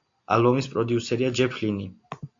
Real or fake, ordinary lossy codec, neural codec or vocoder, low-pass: real; AAC, 48 kbps; none; 7.2 kHz